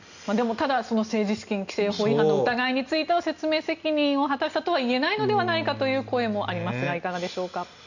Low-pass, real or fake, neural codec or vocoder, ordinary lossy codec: 7.2 kHz; real; none; none